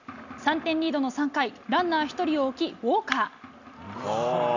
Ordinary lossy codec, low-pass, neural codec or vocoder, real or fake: none; 7.2 kHz; none; real